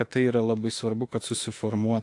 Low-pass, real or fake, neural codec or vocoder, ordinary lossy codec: 10.8 kHz; fake; autoencoder, 48 kHz, 32 numbers a frame, DAC-VAE, trained on Japanese speech; AAC, 48 kbps